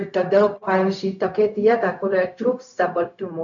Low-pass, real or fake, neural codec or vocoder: 7.2 kHz; fake; codec, 16 kHz, 0.4 kbps, LongCat-Audio-Codec